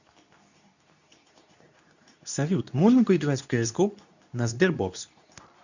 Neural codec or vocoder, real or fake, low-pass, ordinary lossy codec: codec, 24 kHz, 0.9 kbps, WavTokenizer, medium speech release version 2; fake; 7.2 kHz; none